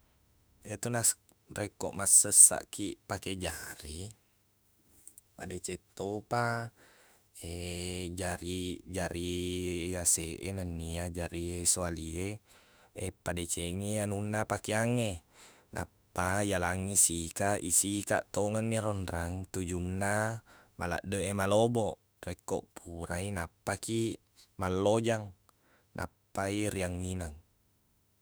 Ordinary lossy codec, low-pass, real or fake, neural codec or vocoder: none; none; fake; autoencoder, 48 kHz, 32 numbers a frame, DAC-VAE, trained on Japanese speech